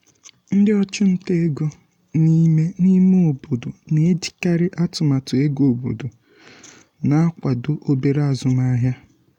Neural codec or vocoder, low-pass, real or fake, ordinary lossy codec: none; 19.8 kHz; real; MP3, 96 kbps